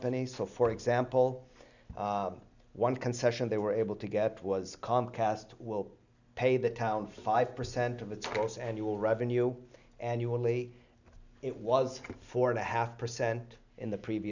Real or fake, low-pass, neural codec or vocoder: real; 7.2 kHz; none